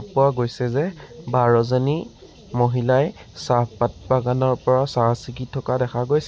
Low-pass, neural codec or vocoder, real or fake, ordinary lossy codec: none; none; real; none